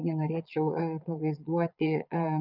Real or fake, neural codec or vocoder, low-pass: fake; vocoder, 22.05 kHz, 80 mel bands, Vocos; 5.4 kHz